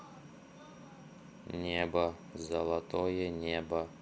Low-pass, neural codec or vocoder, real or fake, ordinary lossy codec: none; none; real; none